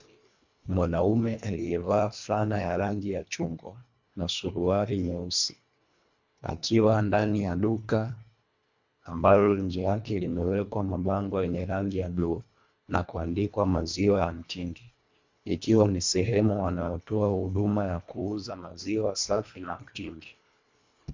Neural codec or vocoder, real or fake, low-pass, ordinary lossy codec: codec, 24 kHz, 1.5 kbps, HILCodec; fake; 7.2 kHz; MP3, 64 kbps